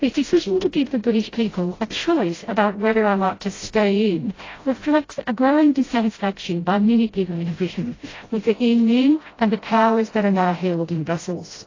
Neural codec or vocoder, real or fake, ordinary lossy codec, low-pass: codec, 16 kHz, 0.5 kbps, FreqCodec, smaller model; fake; AAC, 32 kbps; 7.2 kHz